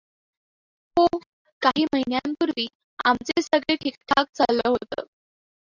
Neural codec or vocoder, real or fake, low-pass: none; real; 7.2 kHz